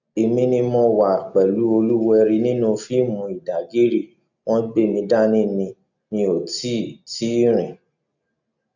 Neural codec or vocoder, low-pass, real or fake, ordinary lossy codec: none; 7.2 kHz; real; none